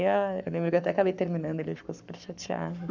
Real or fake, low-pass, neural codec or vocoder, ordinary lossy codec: fake; 7.2 kHz; codec, 44.1 kHz, 7.8 kbps, Pupu-Codec; none